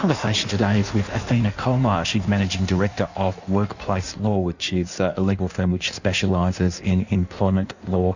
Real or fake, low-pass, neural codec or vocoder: fake; 7.2 kHz; codec, 16 kHz in and 24 kHz out, 1.1 kbps, FireRedTTS-2 codec